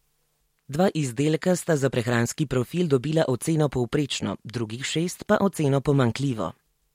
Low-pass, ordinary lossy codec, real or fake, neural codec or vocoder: 19.8 kHz; MP3, 64 kbps; real; none